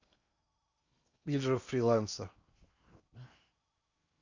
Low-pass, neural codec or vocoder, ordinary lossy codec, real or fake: 7.2 kHz; codec, 16 kHz in and 24 kHz out, 0.8 kbps, FocalCodec, streaming, 65536 codes; Opus, 64 kbps; fake